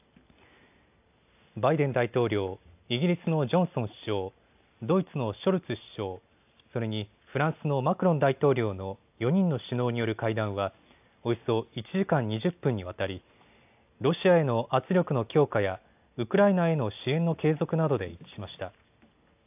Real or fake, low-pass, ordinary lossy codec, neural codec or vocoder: real; 3.6 kHz; AAC, 32 kbps; none